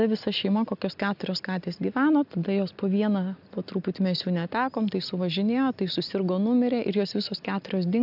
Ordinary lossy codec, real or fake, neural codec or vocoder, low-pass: AAC, 48 kbps; real; none; 5.4 kHz